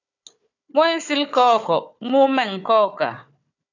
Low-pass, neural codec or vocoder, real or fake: 7.2 kHz; codec, 16 kHz, 4 kbps, FunCodec, trained on Chinese and English, 50 frames a second; fake